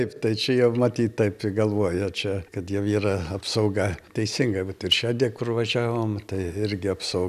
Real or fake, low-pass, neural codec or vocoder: real; 14.4 kHz; none